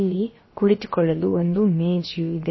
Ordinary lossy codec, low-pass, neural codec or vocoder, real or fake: MP3, 24 kbps; 7.2 kHz; codec, 16 kHz, about 1 kbps, DyCAST, with the encoder's durations; fake